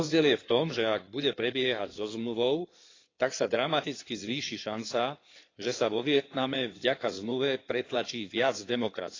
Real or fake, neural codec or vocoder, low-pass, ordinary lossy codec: fake; codec, 16 kHz in and 24 kHz out, 2.2 kbps, FireRedTTS-2 codec; 7.2 kHz; AAC, 32 kbps